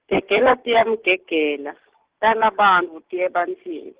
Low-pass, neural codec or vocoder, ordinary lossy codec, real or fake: 3.6 kHz; none; Opus, 16 kbps; real